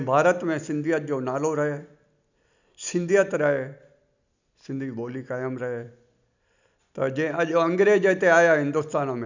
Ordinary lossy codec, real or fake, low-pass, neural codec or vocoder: none; real; 7.2 kHz; none